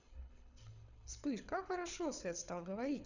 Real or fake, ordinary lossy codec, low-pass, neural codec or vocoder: fake; none; 7.2 kHz; codec, 24 kHz, 6 kbps, HILCodec